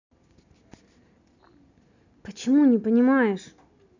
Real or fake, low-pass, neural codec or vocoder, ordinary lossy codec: real; 7.2 kHz; none; none